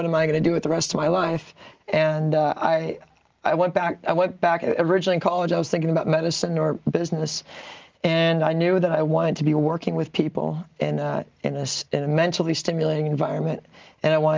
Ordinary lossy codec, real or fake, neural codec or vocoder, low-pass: Opus, 24 kbps; real; none; 7.2 kHz